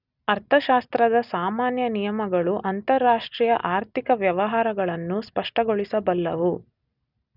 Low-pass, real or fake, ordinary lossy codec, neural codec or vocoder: 5.4 kHz; real; none; none